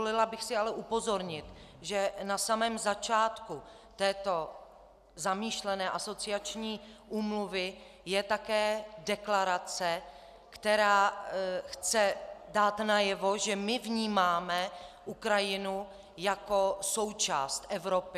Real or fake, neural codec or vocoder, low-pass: real; none; 14.4 kHz